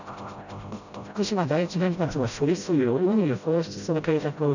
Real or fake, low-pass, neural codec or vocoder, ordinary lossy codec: fake; 7.2 kHz; codec, 16 kHz, 0.5 kbps, FreqCodec, smaller model; none